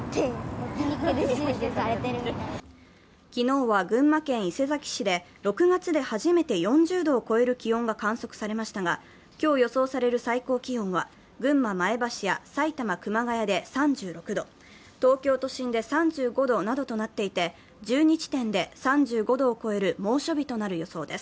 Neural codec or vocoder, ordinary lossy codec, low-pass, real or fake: none; none; none; real